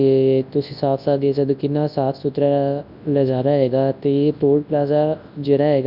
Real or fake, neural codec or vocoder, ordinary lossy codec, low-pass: fake; codec, 24 kHz, 0.9 kbps, WavTokenizer, large speech release; none; 5.4 kHz